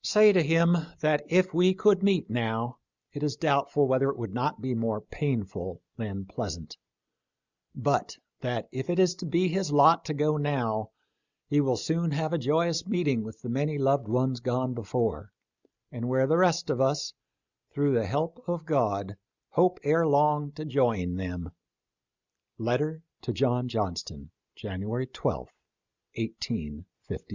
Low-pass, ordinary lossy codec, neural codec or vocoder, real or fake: 7.2 kHz; Opus, 64 kbps; none; real